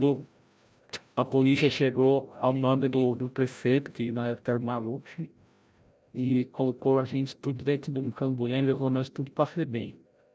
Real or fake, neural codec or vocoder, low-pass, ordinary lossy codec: fake; codec, 16 kHz, 0.5 kbps, FreqCodec, larger model; none; none